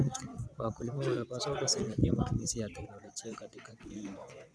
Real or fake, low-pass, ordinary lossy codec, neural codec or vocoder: fake; none; none; vocoder, 22.05 kHz, 80 mel bands, WaveNeXt